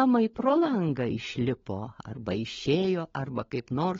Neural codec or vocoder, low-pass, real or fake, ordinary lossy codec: codec, 16 kHz, 16 kbps, FunCodec, trained on LibriTTS, 50 frames a second; 7.2 kHz; fake; AAC, 24 kbps